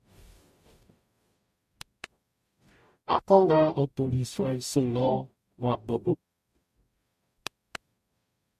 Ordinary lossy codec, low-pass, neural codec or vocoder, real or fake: MP3, 64 kbps; 14.4 kHz; codec, 44.1 kHz, 0.9 kbps, DAC; fake